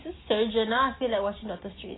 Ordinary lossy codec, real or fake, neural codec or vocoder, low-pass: AAC, 16 kbps; real; none; 7.2 kHz